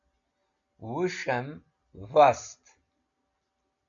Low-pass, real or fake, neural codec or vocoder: 7.2 kHz; real; none